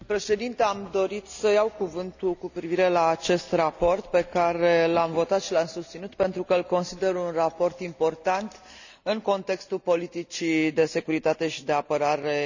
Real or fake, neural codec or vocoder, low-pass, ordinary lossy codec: real; none; 7.2 kHz; none